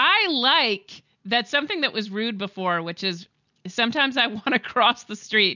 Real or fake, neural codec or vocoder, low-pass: real; none; 7.2 kHz